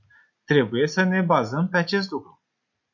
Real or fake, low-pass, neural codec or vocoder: real; 7.2 kHz; none